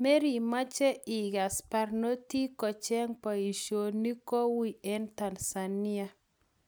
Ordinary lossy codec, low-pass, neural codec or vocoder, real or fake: none; none; none; real